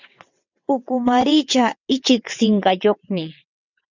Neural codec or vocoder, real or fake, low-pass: vocoder, 22.05 kHz, 80 mel bands, WaveNeXt; fake; 7.2 kHz